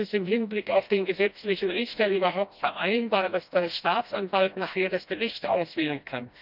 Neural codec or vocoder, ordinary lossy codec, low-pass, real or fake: codec, 16 kHz, 1 kbps, FreqCodec, smaller model; none; 5.4 kHz; fake